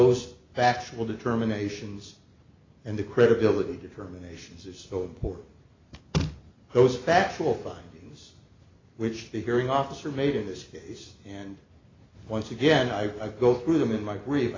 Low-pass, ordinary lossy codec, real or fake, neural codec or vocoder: 7.2 kHz; AAC, 32 kbps; real; none